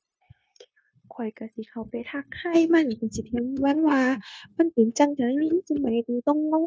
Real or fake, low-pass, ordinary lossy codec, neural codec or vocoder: fake; none; none; codec, 16 kHz, 0.9 kbps, LongCat-Audio-Codec